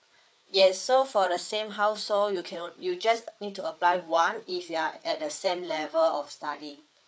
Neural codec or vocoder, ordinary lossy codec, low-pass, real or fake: codec, 16 kHz, 4 kbps, FreqCodec, larger model; none; none; fake